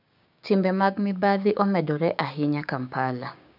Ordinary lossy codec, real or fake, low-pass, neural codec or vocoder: none; fake; 5.4 kHz; codec, 16 kHz, 6 kbps, DAC